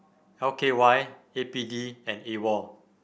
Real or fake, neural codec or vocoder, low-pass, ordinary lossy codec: real; none; none; none